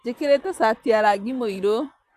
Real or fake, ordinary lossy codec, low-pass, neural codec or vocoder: fake; none; 14.4 kHz; vocoder, 44.1 kHz, 128 mel bands, Pupu-Vocoder